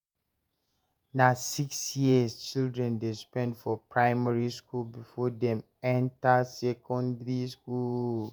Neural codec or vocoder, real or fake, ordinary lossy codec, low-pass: vocoder, 48 kHz, 128 mel bands, Vocos; fake; none; none